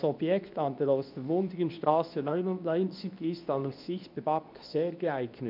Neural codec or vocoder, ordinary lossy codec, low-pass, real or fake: codec, 24 kHz, 0.9 kbps, WavTokenizer, medium speech release version 2; none; 5.4 kHz; fake